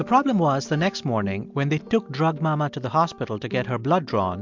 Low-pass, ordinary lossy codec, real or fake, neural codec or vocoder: 7.2 kHz; MP3, 64 kbps; real; none